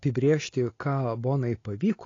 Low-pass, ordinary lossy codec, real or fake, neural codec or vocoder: 7.2 kHz; AAC, 32 kbps; real; none